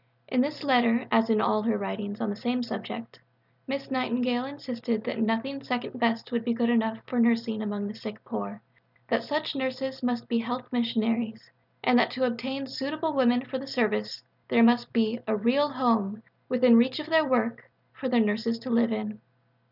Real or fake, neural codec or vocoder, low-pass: real; none; 5.4 kHz